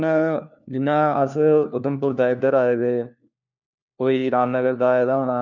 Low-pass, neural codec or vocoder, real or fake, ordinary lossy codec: 7.2 kHz; codec, 16 kHz, 1 kbps, FunCodec, trained on LibriTTS, 50 frames a second; fake; none